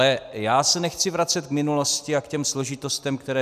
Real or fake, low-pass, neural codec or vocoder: real; 14.4 kHz; none